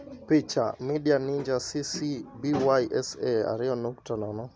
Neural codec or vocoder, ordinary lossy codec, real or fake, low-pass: none; none; real; none